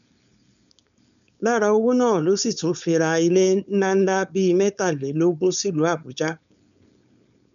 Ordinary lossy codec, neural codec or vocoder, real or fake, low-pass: none; codec, 16 kHz, 4.8 kbps, FACodec; fake; 7.2 kHz